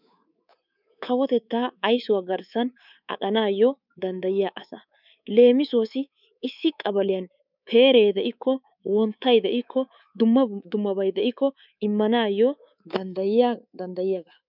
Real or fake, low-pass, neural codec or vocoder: fake; 5.4 kHz; codec, 24 kHz, 3.1 kbps, DualCodec